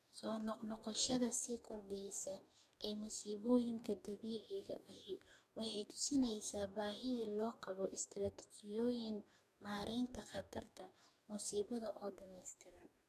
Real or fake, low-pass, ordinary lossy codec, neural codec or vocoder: fake; 14.4 kHz; none; codec, 44.1 kHz, 2.6 kbps, DAC